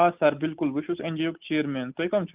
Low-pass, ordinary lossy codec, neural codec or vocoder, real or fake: 3.6 kHz; Opus, 24 kbps; none; real